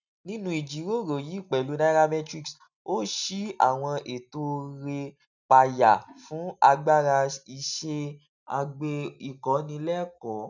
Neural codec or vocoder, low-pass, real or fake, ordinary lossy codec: none; 7.2 kHz; real; none